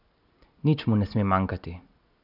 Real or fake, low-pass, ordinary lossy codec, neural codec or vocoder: real; 5.4 kHz; none; none